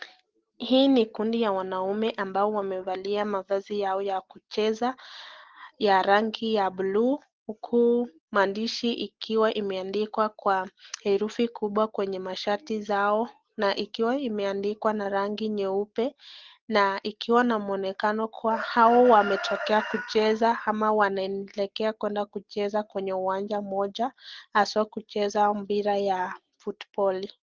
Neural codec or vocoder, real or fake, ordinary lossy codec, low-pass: none; real; Opus, 16 kbps; 7.2 kHz